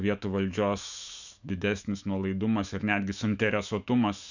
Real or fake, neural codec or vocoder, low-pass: fake; autoencoder, 48 kHz, 128 numbers a frame, DAC-VAE, trained on Japanese speech; 7.2 kHz